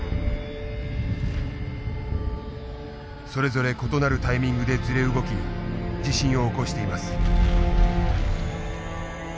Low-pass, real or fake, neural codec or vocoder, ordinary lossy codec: none; real; none; none